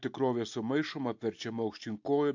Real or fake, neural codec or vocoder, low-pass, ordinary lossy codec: real; none; 7.2 kHz; AAC, 48 kbps